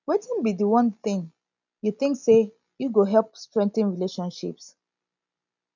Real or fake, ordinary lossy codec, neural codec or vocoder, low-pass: real; none; none; 7.2 kHz